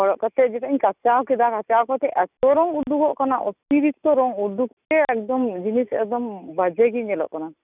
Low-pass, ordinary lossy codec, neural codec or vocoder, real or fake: 3.6 kHz; none; none; real